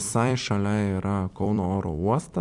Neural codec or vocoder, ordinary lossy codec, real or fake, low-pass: vocoder, 44.1 kHz, 128 mel bands every 256 samples, BigVGAN v2; MP3, 64 kbps; fake; 10.8 kHz